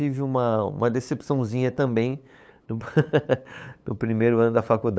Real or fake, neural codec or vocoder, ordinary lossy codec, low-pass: fake; codec, 16 kHz, 8 kbps, FunCodec, trained on LibriTTS, 25 frames a second; none; none